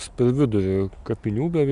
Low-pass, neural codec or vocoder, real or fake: 10.8 kHz; none; real